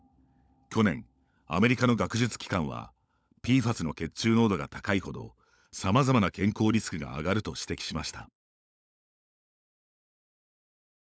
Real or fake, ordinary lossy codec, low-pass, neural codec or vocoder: fake; none; none; codec, 16 kHz, 16 kbps, FunCodec, trained on LibriTTS, 50 frames a second